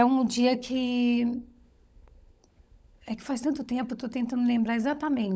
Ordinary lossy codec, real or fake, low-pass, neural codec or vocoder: none; fake; none; codec, 16 kHz, 16 kbps, FunCodec, trained on LibriTTS, 50 frames a second